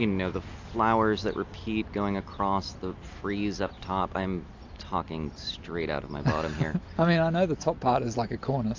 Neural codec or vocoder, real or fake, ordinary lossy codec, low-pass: none; real; AAC, 48 kbps; 7.2 kHz